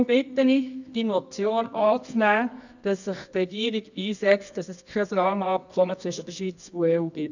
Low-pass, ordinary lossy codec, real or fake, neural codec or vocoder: 7.2 kHz; none; fake; codec, 24 kHz, 0.9 kbps, WavTokenizer, medium music audio release